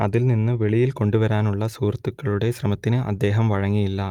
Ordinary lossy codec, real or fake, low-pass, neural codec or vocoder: Opus, 32 kbps; real; 19.8 kHz; none